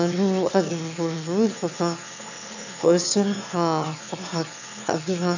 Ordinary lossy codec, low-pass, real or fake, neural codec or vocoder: none; 7.2 kHz; fake; autoencoder, 22.05 kHz, a latent of 192 numbers a frame, VITS, trained on one speaker